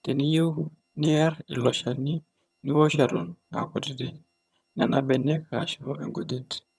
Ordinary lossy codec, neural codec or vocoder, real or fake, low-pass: none; vocoder, 22.05 kHz, 80 mel bands, HiFi-GAN; fake; none